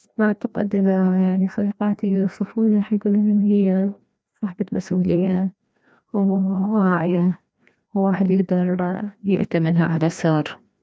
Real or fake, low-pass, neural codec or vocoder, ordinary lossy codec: fake; none; codec, 16 kHz, 1 kbps, FreqCodec, larger model; none